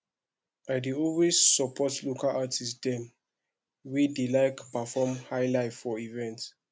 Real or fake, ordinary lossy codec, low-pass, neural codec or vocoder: real; none; none; none